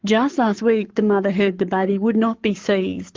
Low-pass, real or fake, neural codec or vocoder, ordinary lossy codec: 7.2 kHz; fake; codec, 44.1 kHz, 7.8 kbps, Pupu-Codec; Opus, 24 kbps